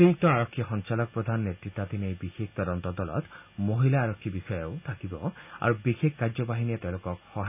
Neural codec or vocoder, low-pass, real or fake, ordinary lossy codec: none; 3.6 kHz; real; none